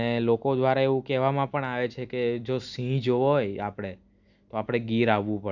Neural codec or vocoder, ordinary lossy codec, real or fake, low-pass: none; none; real; 7.2 kHz